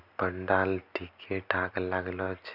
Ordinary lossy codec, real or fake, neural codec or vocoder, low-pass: none; real; none; 5.4 kHz